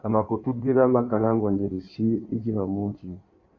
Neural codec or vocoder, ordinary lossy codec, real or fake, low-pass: codec, 16 kHz in and 24 kHz out, 1.1 kbps, FireRedTTS-2 codec; MP3, 48 kbps; fake; 7.2 kHz